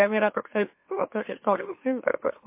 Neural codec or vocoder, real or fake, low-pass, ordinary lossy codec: autoencoder, 44.1 kHz, a latent of 192 numbers a frame, MeloTTS; fake; 3.6 kHz; MP3, 24 kbps